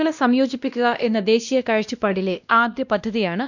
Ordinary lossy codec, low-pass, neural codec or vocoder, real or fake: none; 7.2 kHz; codec, 16 kHz, 1 kbps, X-Codec, WavLM features, trained on Multilingual LibriSpeech; fake